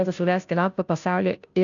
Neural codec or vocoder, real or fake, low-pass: codec, 16 kHz, 0.5 kbps, FunCodec, trained on Chinese and English, 25 frames a second; fake; 7.2 kHz